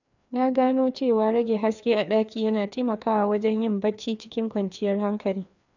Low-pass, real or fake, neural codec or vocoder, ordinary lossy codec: 7.2 kHz; fake; codec, 16 kHz, 2 kbps, FreqCodec, larger model; none